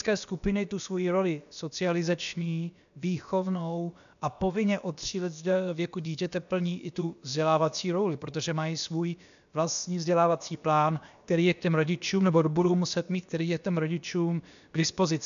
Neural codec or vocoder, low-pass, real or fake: codec, 16 kHz, about 1 kbps, DyCAST, with the encoder's durations; 7.2 kHz; fake